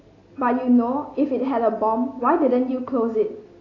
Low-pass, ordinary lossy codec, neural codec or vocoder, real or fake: 7.2 kHz; AAC, 32 kbps; none; real